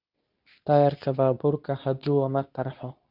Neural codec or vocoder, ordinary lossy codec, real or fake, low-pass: codec, 24 kHz, 0.9 kbps, WavTokenizer, medium speech release version 2; none; fake; 5.4 kHz